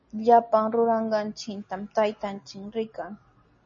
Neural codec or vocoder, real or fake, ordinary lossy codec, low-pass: none; real; MP3, 32 kbps; 7.2 kHz